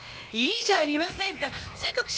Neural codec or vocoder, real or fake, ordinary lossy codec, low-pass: codec, 16 kHz, 0.8 kbps, ZipCodec; fake; none; none